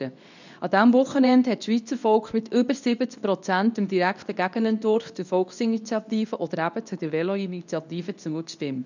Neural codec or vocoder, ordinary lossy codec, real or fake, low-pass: codec, 24 kHz, 0.9 kbps, WavTokenizer, medium speech release version 2; none; fake; 7.2 kHz